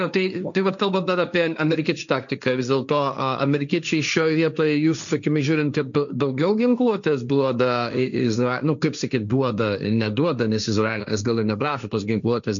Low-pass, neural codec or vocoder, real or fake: 7.2 kHz; codec, 16 kHz, 1.1 kbps, Voila-Tokenizer; fake